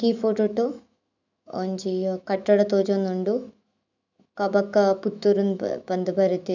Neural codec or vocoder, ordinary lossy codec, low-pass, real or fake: autoencoder, 48 kHz, 128 numbers a frame, DAC-VAE, trained on Japanese speech; none; 7.2 kHz; fake